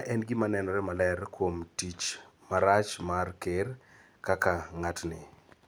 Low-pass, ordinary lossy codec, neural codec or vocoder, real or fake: none; none; none; real